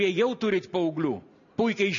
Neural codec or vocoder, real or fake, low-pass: none; real; 7.2 kHz